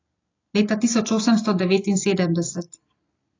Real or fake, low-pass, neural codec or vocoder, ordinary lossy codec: real; 7.2 kHz; none; AAC, 32 kbps